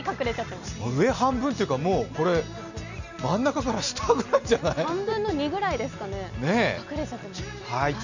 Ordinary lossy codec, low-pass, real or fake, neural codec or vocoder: none; 7.2 kHz; real; none